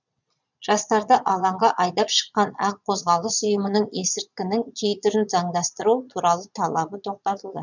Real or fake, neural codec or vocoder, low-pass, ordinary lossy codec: fake; vocoder, 22.05 kHz, 80 mel bands, Vocos; 7.2 kHz; none